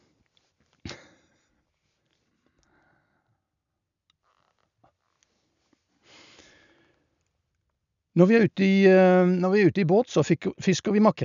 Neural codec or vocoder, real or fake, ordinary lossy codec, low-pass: none; real; none; 7.2 kHz